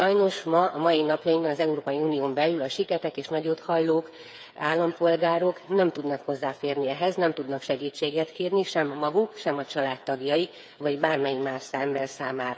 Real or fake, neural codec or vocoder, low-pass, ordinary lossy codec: fake; codec, 16 kHz, 8 kbps, FreqCodec, smaller model; none; none